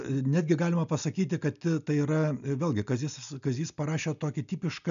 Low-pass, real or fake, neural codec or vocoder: 7.2 kHz; real; none